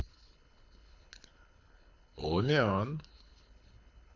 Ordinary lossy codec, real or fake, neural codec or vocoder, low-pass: none; fake; codec, 24 kHz, 6 kbps, HILCodec; 7.2 kHz